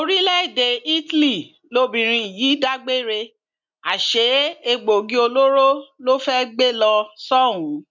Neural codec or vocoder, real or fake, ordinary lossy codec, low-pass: none; real; MP3, 64 kbps; 7.2 kHz